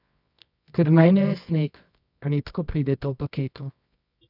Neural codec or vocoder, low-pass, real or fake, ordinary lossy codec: codec, 24 kHz, 0.9 kbps, WavTokenizer, medium music audio release; 5.4 kHz; fake; none